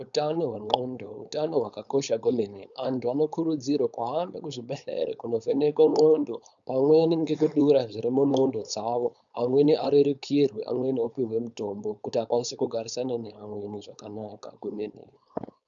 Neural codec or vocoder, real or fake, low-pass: codec, 16 kHz, 4.8 kbps, FACodec; fake; 7.2 kHz